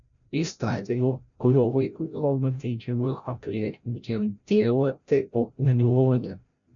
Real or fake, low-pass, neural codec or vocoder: fake; 7.2 kHz; codec, 16 kHz, 0.5 kbps, FreqCodec, larger model